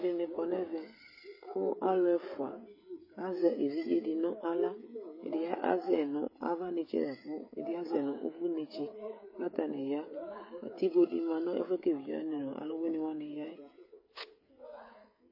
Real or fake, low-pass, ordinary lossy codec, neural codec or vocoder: fake; 5.4 kHz; MP3, 24 kbps; codec, 16 kHz, 16 kbps, FreqCodec, smaller model